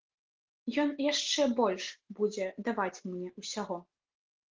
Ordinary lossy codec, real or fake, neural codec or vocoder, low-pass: Opus, 16 kbps; real; none; 7.2 kHz